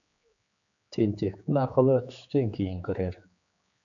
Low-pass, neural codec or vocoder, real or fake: 7.2 kHz; codec, 16 kHz, 4 kbps, X-Codec, HuBERT features, trained on general audio; fake